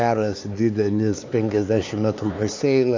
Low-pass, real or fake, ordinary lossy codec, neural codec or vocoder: 7.2 kHz; fake; AAC, 48 kbps; codec, 16 kHz, 4 kbps, X-Codec, HuBERT features, trained on LibriSpeech